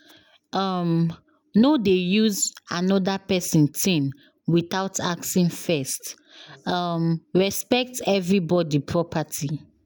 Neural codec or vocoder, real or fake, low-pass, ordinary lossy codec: none; real; none; none